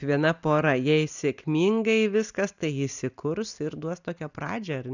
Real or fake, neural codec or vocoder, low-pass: real; none; 7.2 kHz